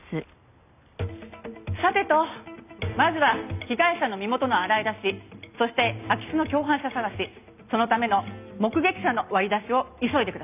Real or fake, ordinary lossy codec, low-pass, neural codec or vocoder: real; none; 3.6 kHz; none